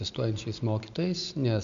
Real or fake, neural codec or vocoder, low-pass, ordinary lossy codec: real; none; 7.2 kHz; MP3, 64 kbps